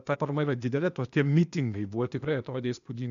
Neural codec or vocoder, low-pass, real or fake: codec, 16 kHz, 0.8 kbps, ZipCodec; 7.2 kHz; fake